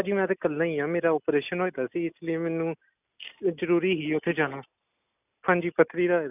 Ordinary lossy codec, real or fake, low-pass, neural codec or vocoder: none; real; 3.6 kHz; none